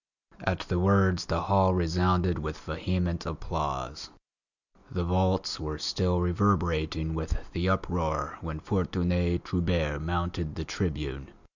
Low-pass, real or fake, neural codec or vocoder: 7.2 kHz; real; none